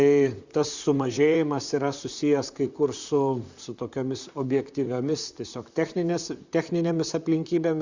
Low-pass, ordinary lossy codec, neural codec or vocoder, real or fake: 7.2 kHz; Opus, 64 kbps; vocoder, 44.1 kHz, 128 mel bands, Pupu-Vocoder; fake